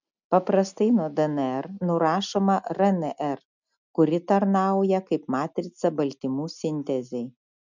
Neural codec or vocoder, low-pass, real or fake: none; 7.2 kHz; real